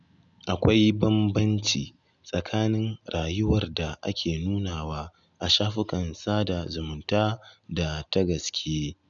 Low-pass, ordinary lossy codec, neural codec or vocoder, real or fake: 7.2 kHz; none; none; real